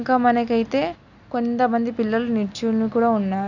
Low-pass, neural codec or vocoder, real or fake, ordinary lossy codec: 7.2 kHz; none; real; none